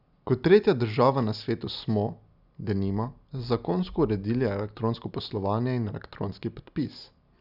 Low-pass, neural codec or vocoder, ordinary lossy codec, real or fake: 5.4 kHz; none; none; real